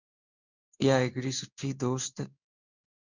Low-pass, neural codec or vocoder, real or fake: 7.2 kHz; codec, 16 kHz in and 24 kHz out, 1 kbps, XY-Tokenizer; fake